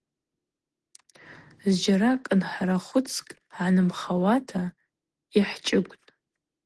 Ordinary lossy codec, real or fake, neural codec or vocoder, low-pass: Opus, 16 kbps; real; none; 10.8 kHz